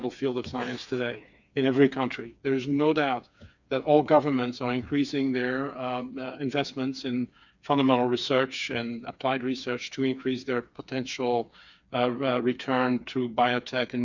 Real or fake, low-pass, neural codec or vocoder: fake; 7.2 kHz; codec, 16 kHz, 4 kbps, FreqCodec, smaller model